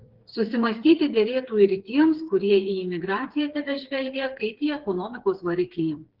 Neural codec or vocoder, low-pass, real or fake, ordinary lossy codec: codec, 16 kHz, 4 kbps, FreqCodec, smaller model; 5.4 kHz; fake; Opus, 16 kbps